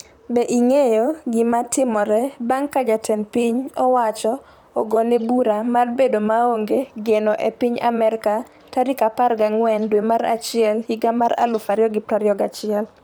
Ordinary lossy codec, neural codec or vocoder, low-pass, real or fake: none; vocoder, 44.1 kHz, 128 mel bands, Pupu-Vocoder; none; fake